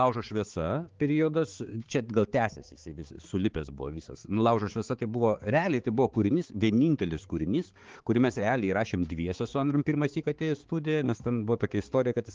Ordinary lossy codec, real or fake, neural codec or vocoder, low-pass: Opus, 16 kbps; fake; codec, 16 kHz, 4 kbps, X-Codec, HuBERT features, trained on balanced general audio; 7.2 kHz